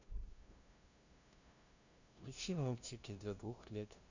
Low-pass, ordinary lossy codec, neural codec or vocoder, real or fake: 7.2 kHz; Opus, 64 kbps; codec, 16 kHz, 0.5 kbps, FunCodec, trained on LibriTTS, 25 frames a second; fake